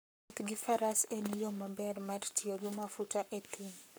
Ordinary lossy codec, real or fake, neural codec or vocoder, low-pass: none; fake; codec, 44.1 kHz, 7.8 kbps, Pupu-Codec; none